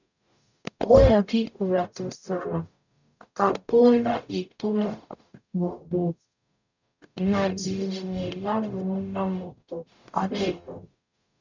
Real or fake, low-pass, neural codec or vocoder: fake; 7.2 kHz; codec, 44.1 kHz, 0.9 kbps, DAC